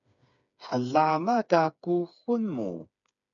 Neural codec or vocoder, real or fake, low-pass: codec, 16 kHz, 4 kbps, FreqCodec, smaller model; fake; 7.2 kHz